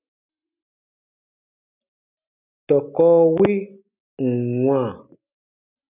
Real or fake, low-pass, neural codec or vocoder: real; 3.6 kHz; none